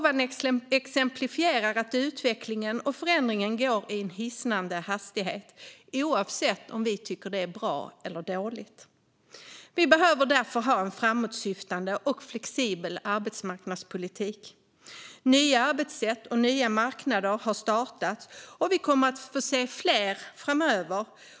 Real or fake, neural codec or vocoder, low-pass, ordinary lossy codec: real; none; none; none